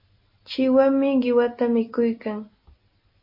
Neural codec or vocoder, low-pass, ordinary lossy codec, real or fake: none; 5.4 kHz; MP3, 24 kbps; real